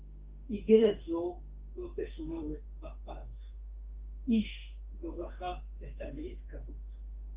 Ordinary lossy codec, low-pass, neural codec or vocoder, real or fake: Opus, 32 kbps; 3.6 kHz; autoencoder, 48 kHz, 32 numbers a frame, DAC-VAE, trained on Japanese speech; fake